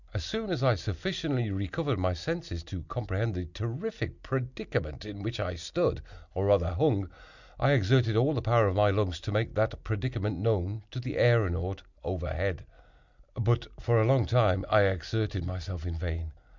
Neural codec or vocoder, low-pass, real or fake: none; 7.2 kHz; real